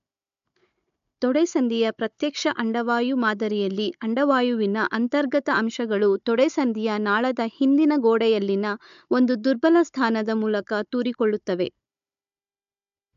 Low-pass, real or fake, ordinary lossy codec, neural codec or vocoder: 7.2 kHz; fake; MP3, 64 kbps; codec, 16 kHz, 16 kbps, FunCodec, trained on Chinese and English, 50 frames a second